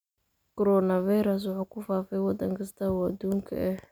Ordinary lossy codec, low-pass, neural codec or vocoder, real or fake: none; none; none; real